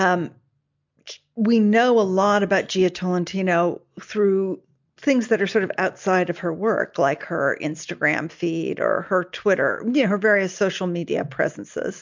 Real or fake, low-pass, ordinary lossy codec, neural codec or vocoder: real; 7.2 kHz; MP3, 64 kbps; none